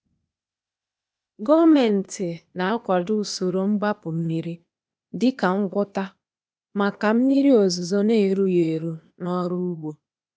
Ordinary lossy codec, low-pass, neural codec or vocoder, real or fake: none; none; codec, 16 kHz, 0.8 kbps, ZipCodec; fake